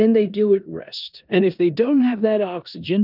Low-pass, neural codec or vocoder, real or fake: 5.4 kHz; codec, 16 kHz in and 24 kHz out, 0.9 kbps, LongCat-Audio-Codec, four codebook decoder; fake